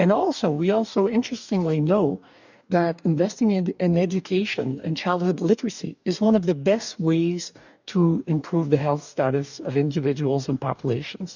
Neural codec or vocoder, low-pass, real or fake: codec, 44.1 kHz, 2.6 kbps, DAC; 7.2 kHz; fake